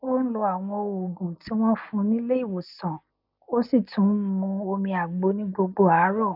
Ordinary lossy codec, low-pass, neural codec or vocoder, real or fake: none; 5.4 kHz; none; real